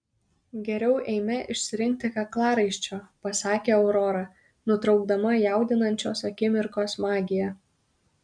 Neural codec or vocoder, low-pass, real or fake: none; 9.9 kHz; real